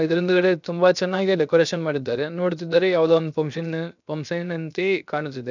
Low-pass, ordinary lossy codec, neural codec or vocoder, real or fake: 7.2 kHz; none; codec, 16 kHz, 0.7 kbps, FocalCodec; fake